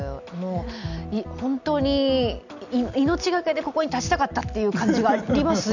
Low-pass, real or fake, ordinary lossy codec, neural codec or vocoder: 7.2 kHz; real; none; none